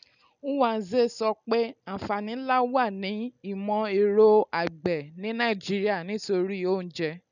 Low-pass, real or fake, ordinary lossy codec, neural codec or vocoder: 7.2 kHz; real; none; none